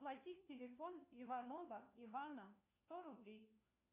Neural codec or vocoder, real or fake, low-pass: codec, 16 kHz, 1 kbps, FunCodec, trained on LibriTTS, 50 frames a second; fake; 3.6 kHz